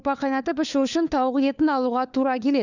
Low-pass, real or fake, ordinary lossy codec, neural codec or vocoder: 7.2 kHz; fake; none; codec, 16 kHz, 4 kbps, FunCodec, trained on LibriTTS, 50 frames a second